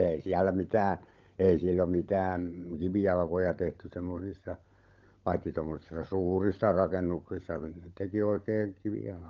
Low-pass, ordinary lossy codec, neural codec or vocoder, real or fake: 7.2 kHz; Opus, 24 kbps; codec, 16 kHz, 16 kbps, FunCodec, trained on LibriTTS, 50 frames a second; fake